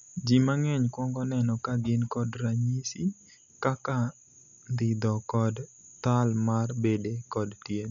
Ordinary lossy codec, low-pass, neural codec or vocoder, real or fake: none; 7.2 kHz; none; real